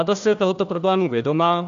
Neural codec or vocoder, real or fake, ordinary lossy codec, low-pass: codec, 16 kHz, 1 kbps, FunCodec, trained on Chinese and English, 50 frames a second; fake; AAC, 96 kbps; 7.2 kHz